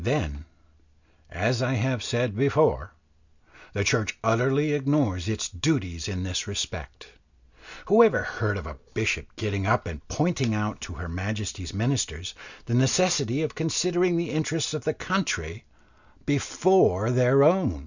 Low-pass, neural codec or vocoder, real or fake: 7.2 kHz; none; real